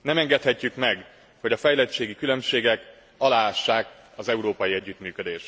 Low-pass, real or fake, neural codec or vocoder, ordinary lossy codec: none; real; none; none